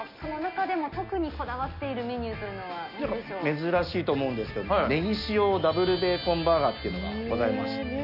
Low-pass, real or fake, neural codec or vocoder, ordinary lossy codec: 5.4 kHz; real; none; none